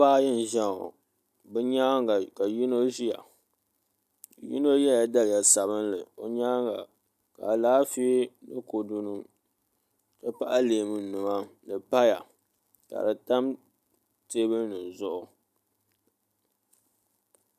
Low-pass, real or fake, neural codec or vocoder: 14.4 kHz; real; none